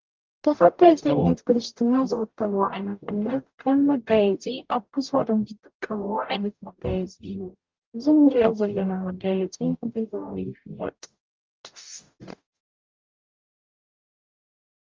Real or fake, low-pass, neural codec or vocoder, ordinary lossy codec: fake; 7.2 kHz; codec, 44.1 kHz, 0.9 kbps, DAC; Opus, 32 kbps